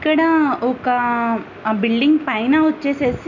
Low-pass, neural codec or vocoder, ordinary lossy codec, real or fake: 7.2 kHz; none; none; real